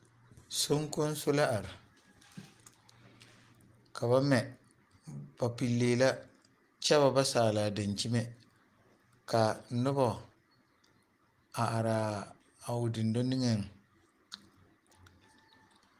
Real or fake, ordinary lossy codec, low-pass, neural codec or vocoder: real; Opus, 24 kbps; 14.4 kHz; none